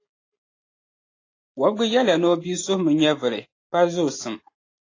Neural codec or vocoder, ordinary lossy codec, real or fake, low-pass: none; AAC, 32 kbps; real; 7.2 kHz